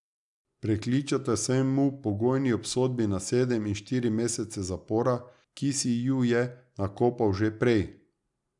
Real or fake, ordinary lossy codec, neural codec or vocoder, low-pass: real; none; none; 10.8 kHz